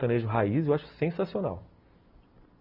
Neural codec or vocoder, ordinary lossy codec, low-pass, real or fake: none; none; 5.4 kHz; real